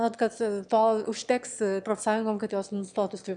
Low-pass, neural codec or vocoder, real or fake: 9.9 kHz; autoencoder, 22.05 kHz, a latent of 192 numbers a frame, VITS, trained on one speaker; fake